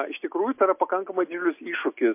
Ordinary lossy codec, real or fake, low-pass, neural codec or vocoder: MP3, 32 kbps; real; 3.6 kHz; none